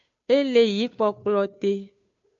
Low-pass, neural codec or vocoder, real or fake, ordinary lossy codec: 7.2 kHz; codec, 16 kHz, 2 kbps, FunCodec, trained on Chinese and English, 25 frames a second; fake; MP3, 64 kbps